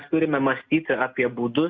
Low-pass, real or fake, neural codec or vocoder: 7.2 kHz; real; none